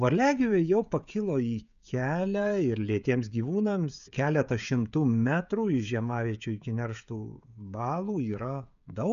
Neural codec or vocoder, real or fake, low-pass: codec, 16 kHz, 16 kbps, FreqCodec, smaller model; fake; 7.2 kHz